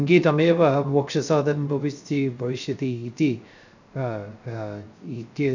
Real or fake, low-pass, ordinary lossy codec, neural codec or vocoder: fake; 7.2 kHz; none; codec, 16 kHz, 0.3 kbps, FocalCodec